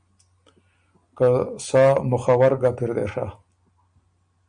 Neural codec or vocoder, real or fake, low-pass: none; real; 9.9 kHz